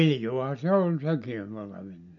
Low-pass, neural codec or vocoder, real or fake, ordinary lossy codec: 7.2 kHz; none; real; none